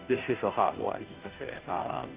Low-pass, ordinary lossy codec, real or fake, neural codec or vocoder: 3.6 kHz; Opus, 24 kbps; fake; codec, 16 kHz, 0.5 kbps, FunCodec, trained on Chinese and English, 25 frames a second